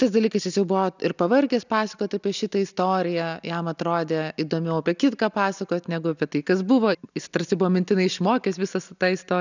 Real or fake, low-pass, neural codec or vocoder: real; 7.2 kHz; none